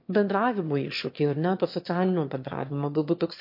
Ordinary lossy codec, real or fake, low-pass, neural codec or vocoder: MP3, 32 kbps; fake; 5.4 kHz; autoencoder, 22.05 kHz, a latent of 192 numbers a frame, VITS, trained on one speaker